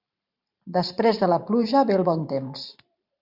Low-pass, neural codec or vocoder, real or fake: 5.4 kHz; vocoder, 44.1 kHz, 128 mel bands every 512 samples, BigVGAN v2; fake